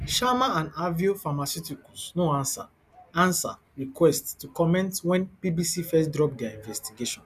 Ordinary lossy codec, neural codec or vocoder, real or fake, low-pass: none; none; real; 14.4 kHz